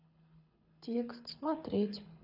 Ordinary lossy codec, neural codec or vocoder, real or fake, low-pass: none; codec, 24 kHz, 6 kbps, HILCodec; fake; 5.4 kHz